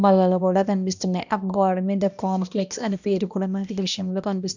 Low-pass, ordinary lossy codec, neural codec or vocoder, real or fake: 7.2 kHz; none; codec, 16 kHz, 1 kbps, X-Codec, HuBERT features, trained on balanced general audio; fake